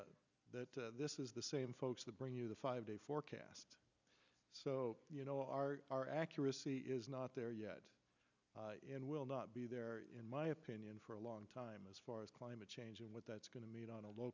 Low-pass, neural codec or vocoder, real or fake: 7.2 kHz; none; real